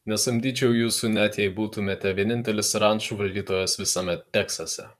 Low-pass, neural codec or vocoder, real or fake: 14.4 kHz; vocoder, 44.1 kHz, 128 mel bands, Pupu-Vocoder; fake